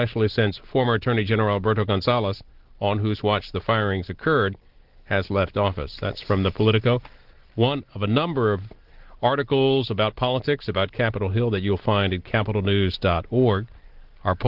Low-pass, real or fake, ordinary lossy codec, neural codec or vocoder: 5.4 kHz; real; Opus, 16 kbps; none